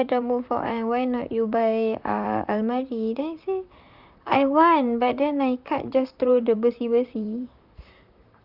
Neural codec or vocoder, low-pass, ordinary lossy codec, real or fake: vocoder, 44.1 kHz, 128 mel bands, Pupu-Vocoder; 5.4 kHz; Opus, 64 kbps; fake